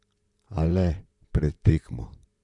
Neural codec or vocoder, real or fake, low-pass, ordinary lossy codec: vocoder, 44.1 kHz, 128 mel bands, Pupu-Vocoder; fake; 10.8 kHz; none